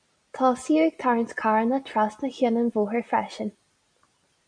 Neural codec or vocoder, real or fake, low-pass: none; real; 9.9 kHz